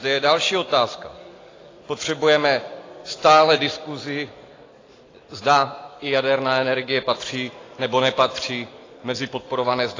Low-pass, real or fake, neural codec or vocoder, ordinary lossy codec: 7.2 kHz; real; none; AAC, 32 kbps